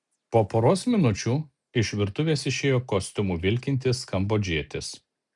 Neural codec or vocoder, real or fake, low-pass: none; real; 10.8 kHz